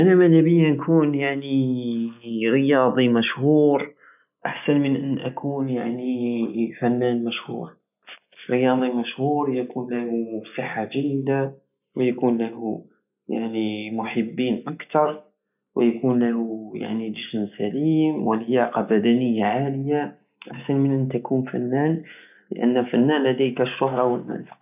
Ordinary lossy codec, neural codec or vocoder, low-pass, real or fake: none; none; 3.6 kHz; real